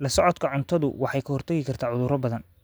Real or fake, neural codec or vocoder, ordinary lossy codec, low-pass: real; none; none; none